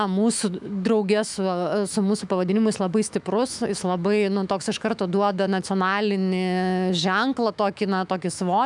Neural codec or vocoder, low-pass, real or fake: autoencoder, 48 kHz, 128 numbers a frame, DAC-VAE, trained on Japanese speech; 10.8 kHz; fake